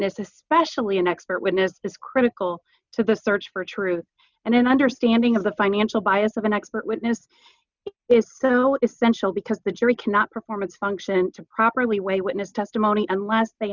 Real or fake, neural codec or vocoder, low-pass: real; none; 7.2 kHz